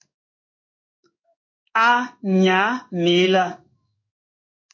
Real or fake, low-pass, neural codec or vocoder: fake; 7.2 kHz; codec, 16 kHz in and 24 kHz out, 1 kbps, XY-Tokenizer